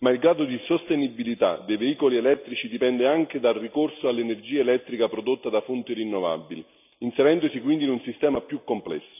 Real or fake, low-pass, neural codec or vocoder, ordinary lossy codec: real; 3.6 kHz; none; none